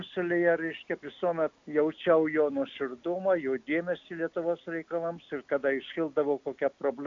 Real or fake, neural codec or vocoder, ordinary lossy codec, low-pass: real; none; AAC, 64 kbps; 7.2 kHz